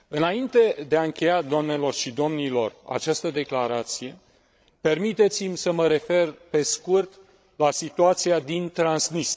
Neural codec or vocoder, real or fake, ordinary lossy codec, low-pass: codec, 16 kHz, 16 kbps, FreqCodec, larger model; fake; none; none